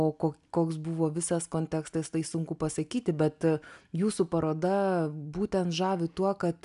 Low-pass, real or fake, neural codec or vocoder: 10.8 kHz; real; none